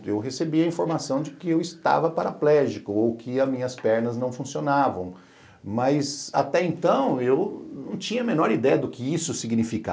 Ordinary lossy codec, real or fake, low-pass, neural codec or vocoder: none; real; none; none